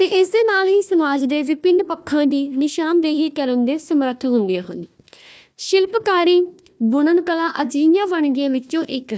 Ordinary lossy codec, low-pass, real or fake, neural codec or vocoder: none; none; fake; codec, 16 kHz, 1 kbps, FunCodec, trained on Chinese and English, 50 frames a second